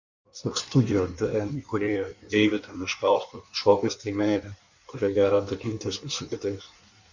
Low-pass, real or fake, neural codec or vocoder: 7.2 kHz; fake; codec, 16 kHz in and 24 kHz out, 1.1 kbps, FireRedTTS-2 codec